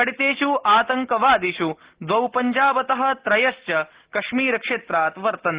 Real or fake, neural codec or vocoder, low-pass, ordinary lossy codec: real; none; 3.6 kHz; Opus, 16 kbps